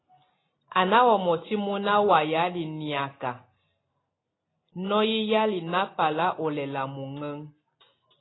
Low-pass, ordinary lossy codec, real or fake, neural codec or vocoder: 7.2 kHz; AAC, 16 kbps; real; none